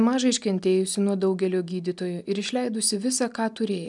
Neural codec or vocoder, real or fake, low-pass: none; real; 10.8 kHz